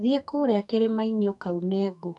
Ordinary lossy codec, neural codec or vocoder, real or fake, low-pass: none; codec, 44.1 kHz, 2.6 kbps, SNAC; fake; 10.8 kHz